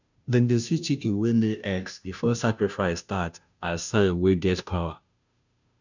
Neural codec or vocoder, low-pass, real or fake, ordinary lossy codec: codec, 16 kHz, 0.5 kbps, FunCodec, trained on Chinese and English, 25 frames a second; 7.2 kHz; fake; none